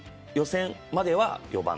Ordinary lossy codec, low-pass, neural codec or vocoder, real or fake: none; none; none; real